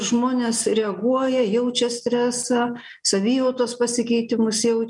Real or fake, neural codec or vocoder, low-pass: real; none; 10.8 kHz